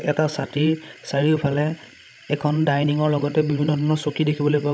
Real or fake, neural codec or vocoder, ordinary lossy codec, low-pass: fake; codec, 16 kHz, 16 kbps, FreqCodec, larger model; none; none